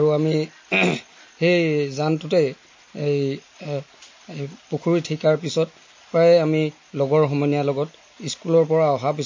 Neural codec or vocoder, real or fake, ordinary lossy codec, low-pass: none; real; MP3, 32 kbps; 7.2 kHz